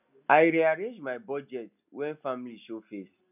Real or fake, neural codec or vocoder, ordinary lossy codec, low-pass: real; none; none; 3.6 kHz